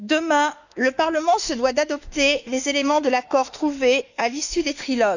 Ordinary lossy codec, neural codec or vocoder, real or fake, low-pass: none; autoencoder, 48 kHz, 32 numbers a frame, DAC-VAE, trained on Japanese speech; fake; 7.2 kHz